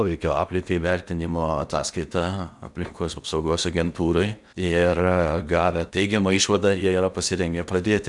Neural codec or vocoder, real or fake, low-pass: codec, 16 kHz in and 24 kHz out, 0.8 kbps, FocalCodec, streaming, 65536 codes; fake; 10.8 kHz